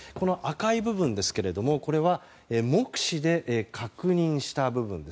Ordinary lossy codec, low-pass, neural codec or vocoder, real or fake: none; none; none; real